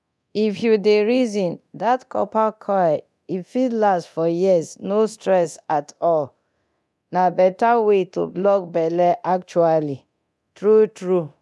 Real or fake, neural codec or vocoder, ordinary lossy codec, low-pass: fake; codec, 24 kHz, 0.9 kbps, DualCodec; none; none